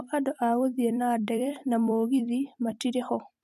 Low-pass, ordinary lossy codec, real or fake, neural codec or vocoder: 14.4 kHz; MP3, 64 kbps; fake; vocoder, 44.1 kHz, 128 mel bands every 256 samples, BigVGAN v2